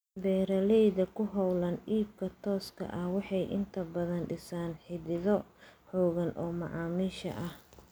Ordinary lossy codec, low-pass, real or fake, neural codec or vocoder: none; none; real; none